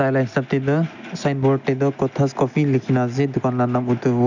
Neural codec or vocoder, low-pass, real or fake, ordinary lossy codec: none; 7.2 kHz; real; none